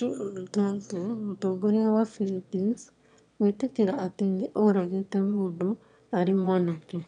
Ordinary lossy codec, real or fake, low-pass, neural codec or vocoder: none; fake; 9.9 kHz; autoencoder, 22.05 kHz, a latent of 192 numbers a frame, VITS, trained on one speaker